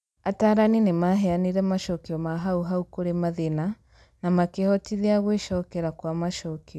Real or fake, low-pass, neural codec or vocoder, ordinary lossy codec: real; none; none; none